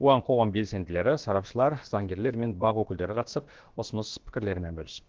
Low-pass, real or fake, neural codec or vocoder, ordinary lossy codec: 7.2 kHz; fake; codec, 16 kHz, about 1 kbps, DyCAST, with the encoder's durations; Opus, 16 kbps